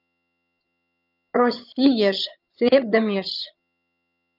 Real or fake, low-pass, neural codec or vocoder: fake; 5.4 kHz; vocoder, 22.05 kHz, 80 mel bands, HiFi-GAN